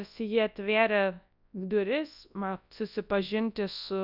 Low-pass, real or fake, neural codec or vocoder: 5.4 kHz; fake; codec, 24 kHz, 0.9 kbps, WavTokenizer, large speech release